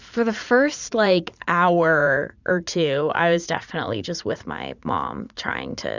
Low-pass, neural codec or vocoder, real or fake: 7.2 kHz; vocoder, 44.1 kHz, 80 mel bands, Vocos; fake